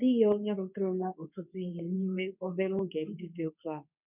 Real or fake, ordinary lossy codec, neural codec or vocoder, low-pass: fake; none; codec, 24 kHz, 0.9 kbps, WavTokenizer, medium speech release version 2; 3.6 kHz